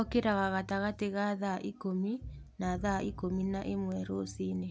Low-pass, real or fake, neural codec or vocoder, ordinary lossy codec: none; real; none; none